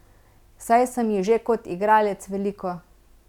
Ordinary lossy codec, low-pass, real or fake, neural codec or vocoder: none; 19.8 kHz; real; none